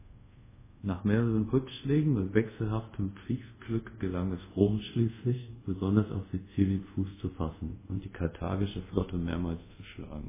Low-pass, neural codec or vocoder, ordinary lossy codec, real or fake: 3.6 kHz; codec, 24 kHz, 0.5 kbps, DualCodec; MP3, 16 kbps; fake